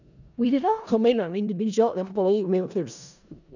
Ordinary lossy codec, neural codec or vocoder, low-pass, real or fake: none; codec, 16 kHz in and 24 kHz out, 0.4 kbps, LongCat-Audio-Codec, four codebook decoder; 7.2 kHz; fake